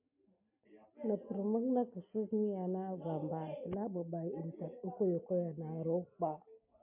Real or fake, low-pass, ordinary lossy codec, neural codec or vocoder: real; 3.6 kHz; MP3, 32 kbps; none